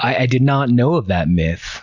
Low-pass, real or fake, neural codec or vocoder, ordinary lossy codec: 7.2 kHz; real; none; Opus, 64 kbps